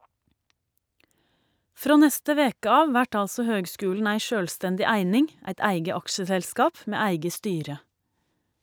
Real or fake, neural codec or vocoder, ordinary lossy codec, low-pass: real; none; none; none